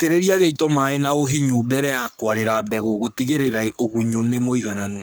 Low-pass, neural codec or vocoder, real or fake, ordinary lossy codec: none; codec, 44.1 kHz, 3.4 kbps, Pupu-Codec; fake; none